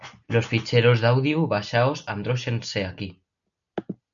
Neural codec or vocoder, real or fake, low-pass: none; real; 7.2 kHz